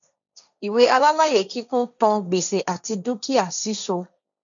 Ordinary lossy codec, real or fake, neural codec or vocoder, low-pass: none; fake; codec, 16 kHz, 1.1 kbps, Voila-Tokenizer; 7.2 kHz